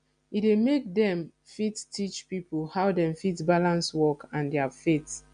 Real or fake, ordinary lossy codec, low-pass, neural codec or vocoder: real; none; 9.9 kHz; none